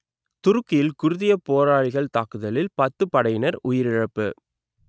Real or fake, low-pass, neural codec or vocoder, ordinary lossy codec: real; none; none; none